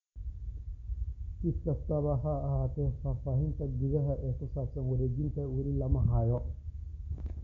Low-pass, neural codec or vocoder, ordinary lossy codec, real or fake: 7.2 kHz; none; none; real